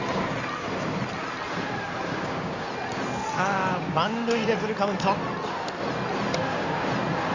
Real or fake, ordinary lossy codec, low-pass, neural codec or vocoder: fake; Opus, 64 kbps; 7.2 kHz; codec, 16 kHz in and 24 kHz out, 2.2 kbps, FireRedTTS-2 codec